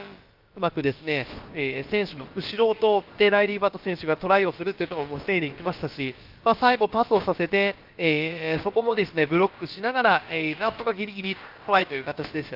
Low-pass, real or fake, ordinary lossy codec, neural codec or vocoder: 5.4 kHz; fake; Opus, 32 kbps; codec, 16 kHz, about 1 kbps, DyCAST, with the encoder's durations